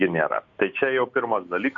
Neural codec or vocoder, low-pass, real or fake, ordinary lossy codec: none; 7.2 kHz; real; AAC, 64 kbps